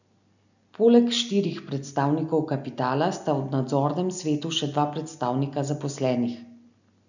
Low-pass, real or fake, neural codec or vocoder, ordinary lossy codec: 7.2 kHz; real; none; none